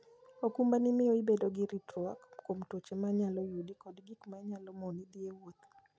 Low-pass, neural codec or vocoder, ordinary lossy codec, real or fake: none; none; none; real